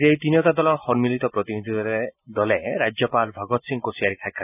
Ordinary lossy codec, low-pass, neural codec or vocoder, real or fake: none; 3.6 kHz; none; real